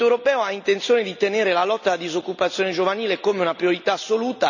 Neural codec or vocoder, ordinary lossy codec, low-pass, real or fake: none; none; 7.2 kHz; real